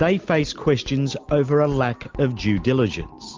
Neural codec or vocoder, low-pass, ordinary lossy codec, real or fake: none; 7.2 kHz; Opus, 24 kbps; real